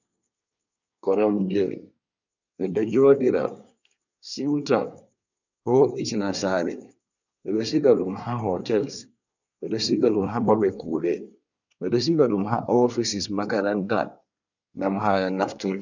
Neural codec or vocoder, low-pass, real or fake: codec, 24 kHz, 1 kbps, SNAC; 7.2 kHz; fake